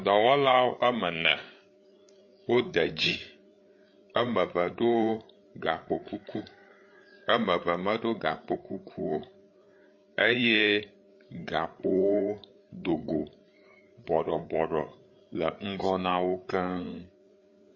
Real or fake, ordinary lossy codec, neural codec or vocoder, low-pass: fake; MP3, 32 kbps; codec, 16 kHz, 8 kbps, FreqCodec, larger model; 7.2 kHz